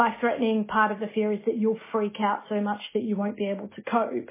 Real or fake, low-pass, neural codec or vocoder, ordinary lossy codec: real; 3.6 kHz; none; MP3, 16 kbps